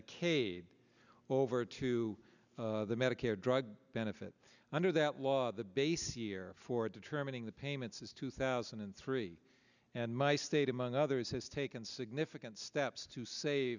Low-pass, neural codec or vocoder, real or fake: 7.2 kHz; none; real